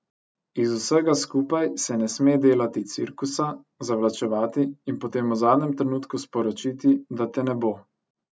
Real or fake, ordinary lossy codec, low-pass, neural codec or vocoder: real; none; none; none